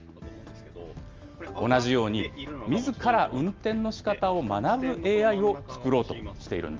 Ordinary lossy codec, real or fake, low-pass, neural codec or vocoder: Opus, 32 kbps; real; 7.2 kHz; none